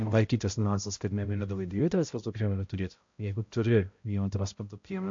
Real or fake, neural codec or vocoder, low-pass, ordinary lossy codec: fake; codec, 16 kHz, 0.5 kbps, X-Codec, HuBERT features, trained on balanced general audio; 7.2 kHz; MP3, 48 kbps